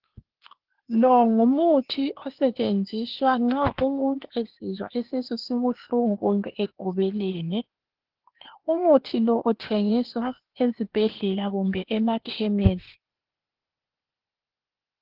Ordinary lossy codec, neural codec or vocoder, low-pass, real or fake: Opus, 16 kbps; codec, 16 kHz, 0.8 kbps, ZipCodec; 5.4 kHz; fake